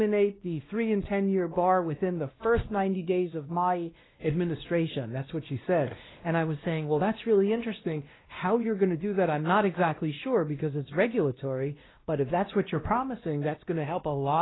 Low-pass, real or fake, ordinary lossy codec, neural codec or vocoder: 7.2 kHz; fake; AAC, 16 kbps; codec, 16 kHz, 1 kbps, X-Codec, WavLM features, trained on Multilingual LibriSpeech